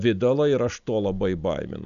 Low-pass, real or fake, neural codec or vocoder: 7.2 kHz; real; none